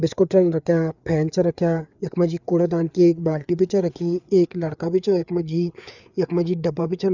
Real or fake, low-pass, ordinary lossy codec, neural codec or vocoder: fake; 7.2 kHz; none; codec, 16 kHz, 4 kbps, FreqCodec, larger model